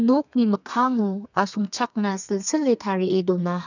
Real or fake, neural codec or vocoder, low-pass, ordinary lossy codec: fake; codec, 32 kHz, 1.9 kbps, SNAC; 7.2 kHz; none